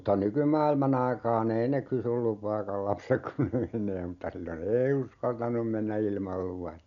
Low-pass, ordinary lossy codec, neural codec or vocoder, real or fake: 7.2 kHz; none; none; real